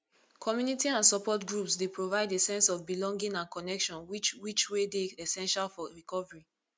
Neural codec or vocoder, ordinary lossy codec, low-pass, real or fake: none; none; none; real